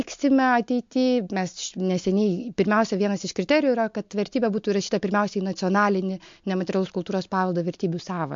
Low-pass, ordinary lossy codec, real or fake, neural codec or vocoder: 7.2 kHz; MP3, 48 kbps; real; none